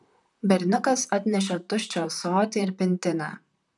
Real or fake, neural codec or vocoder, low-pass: fake; vocoder, 44.1 kHz, 128 mel bands, Pupu-Vocoder; 10.8 kHz